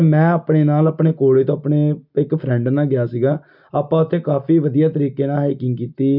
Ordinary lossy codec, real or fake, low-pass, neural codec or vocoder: none; real; 5.4 kHz; none